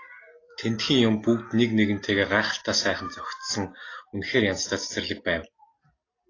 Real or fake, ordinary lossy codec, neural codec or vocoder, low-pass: real; AAC, 32 kbps; none; 7.2 kHz